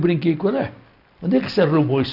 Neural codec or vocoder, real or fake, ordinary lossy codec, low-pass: none; real; none; 5.4 kHz